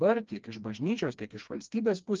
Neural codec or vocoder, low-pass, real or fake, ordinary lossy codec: codec, 16 kHz, 2 kbps, FreqCodec, smaller model; 7.2 kHz; fake; Opus, 24 kbps